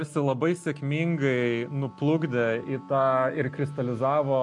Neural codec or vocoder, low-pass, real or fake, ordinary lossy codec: none; 10.8 kHz; real; MP3, 64 kbps